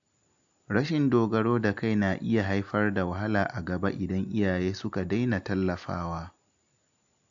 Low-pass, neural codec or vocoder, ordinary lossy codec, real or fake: 7.2 kHz; none; AAC, 64 kbps; real